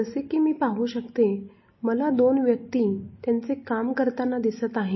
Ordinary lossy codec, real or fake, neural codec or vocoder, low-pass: MP3, 24 kbps; real; none; 7.2 kHz